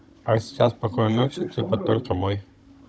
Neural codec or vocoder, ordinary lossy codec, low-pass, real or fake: codec, 16 kHz, 16 kbps, FunCodec, trained on Chinese and English, 50 frames a second; none; none; fake